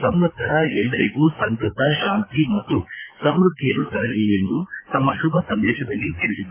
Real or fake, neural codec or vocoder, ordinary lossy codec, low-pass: fake; codec, 16 kHz, 4 kbps, FreqCodec, larger model; AAC, 24 kbps; 3.6 kHz